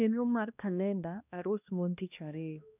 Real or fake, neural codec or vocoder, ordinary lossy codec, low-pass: fake; codec, 16 kHz, 1 kbps, X-Codec, HuBERT features, trained on balanced general audio; none; 3.6 kHz